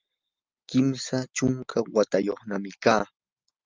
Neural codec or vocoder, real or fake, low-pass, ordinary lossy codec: none; real; 7.2 kHz; Opus, 24 kbps